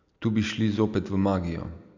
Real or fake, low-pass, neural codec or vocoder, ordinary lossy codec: real; 7.2 kHz; none; none